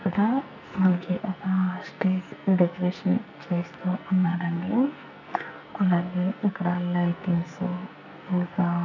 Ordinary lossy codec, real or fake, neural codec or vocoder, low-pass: none; fake; codec, 44.1 kHz, 2.6 kbps, SNAC; 7.2 kHz